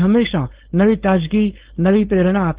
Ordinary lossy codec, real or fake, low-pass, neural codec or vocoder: Opus, 16 kbps; fake; 3.6 kHz; codec, 16 kHz, 4.8 kbps, FACodec